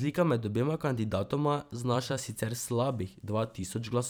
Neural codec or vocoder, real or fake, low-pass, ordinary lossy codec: vocoder, 44.1 kHz, 128 mel bands every 512 samples, BigVGAN v2; fake; none; none